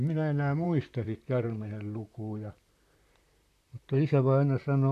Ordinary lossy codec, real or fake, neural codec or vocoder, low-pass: none; fake; vocoder, 44.1 kHz, 128 mel bands, Pupu-Vocoder; 14.4 kHz